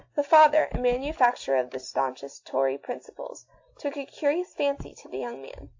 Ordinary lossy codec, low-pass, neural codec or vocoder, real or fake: AAC, 48 kbps; 7.2 kHz; none; real